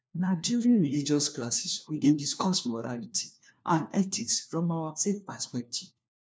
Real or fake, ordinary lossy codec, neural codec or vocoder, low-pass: fake; none; codec, 16 kHz, 1 kbps, FunCodec, trained on LibriTTS, 50 frames a second; none